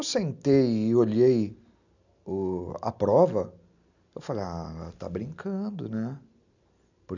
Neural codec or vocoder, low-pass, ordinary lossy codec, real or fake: none; 7.2 kHz; none; real